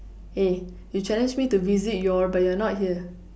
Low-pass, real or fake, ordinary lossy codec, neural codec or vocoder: none; real; none; none